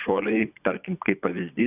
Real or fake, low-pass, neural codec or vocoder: fake; 3.6 kHz; vocoder, 22.05 kHz, 80 mel bands, Vocos